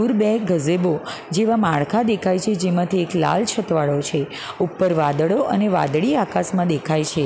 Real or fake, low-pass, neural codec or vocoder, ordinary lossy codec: real; none; none; none